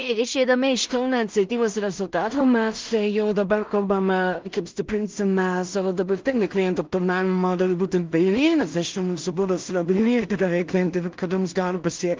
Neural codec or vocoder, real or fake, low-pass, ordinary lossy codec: codec, 16 kHz in and 24 kHz out, 0.4 kbps, LongCat-Audio-Codec, two codebook decoder; fake; 7.2 kHz; Opus, 24 kbps